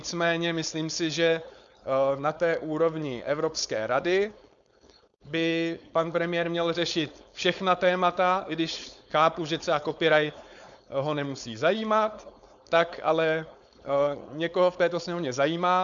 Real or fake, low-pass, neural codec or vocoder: fake; 7.2 kHz; codec, 16 kHz, 4.8 kbps, FACodec